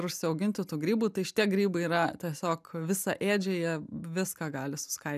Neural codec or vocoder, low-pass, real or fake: none; 14.4 kHz; real